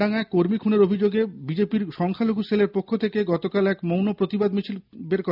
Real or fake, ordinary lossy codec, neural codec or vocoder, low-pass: real; none; none; 5.4 kHz